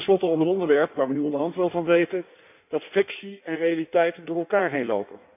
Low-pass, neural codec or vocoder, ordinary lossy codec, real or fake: 3.6 kHz; codec, 16 kHz in and 24 kHz out, 1.1 kbps, FireRedTTS-2 codec; none; fake